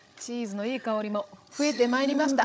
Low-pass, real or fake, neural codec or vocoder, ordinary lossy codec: none; fake; codec, 16 kHz, 16 kbps, FreqCodec, larger model; none